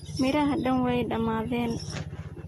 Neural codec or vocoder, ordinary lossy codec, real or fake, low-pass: none; AAC, 32 kbps; real; 14.4 kHz